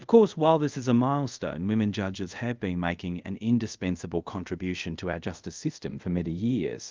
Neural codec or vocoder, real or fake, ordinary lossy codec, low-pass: codec, 24 kHz, 0.5 kbps, DualCodec; fake; Opus, 24 kbps; 7.2 kHz